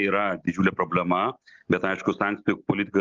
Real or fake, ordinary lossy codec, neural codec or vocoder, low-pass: real; Opus, 32 kbps; none; 7.2 kHz